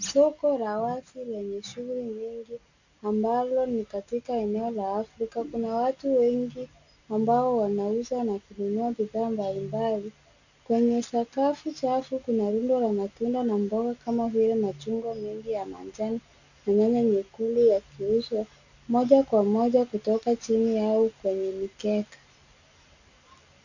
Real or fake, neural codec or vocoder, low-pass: real; none; 7.2 kHz